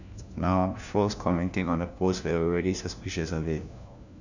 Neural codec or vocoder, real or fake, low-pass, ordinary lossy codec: codec, 16 kHz, 1 kbps, FunCodec, trained on LibriTTS, 50 frames a second; fake; 7.2 kHz; none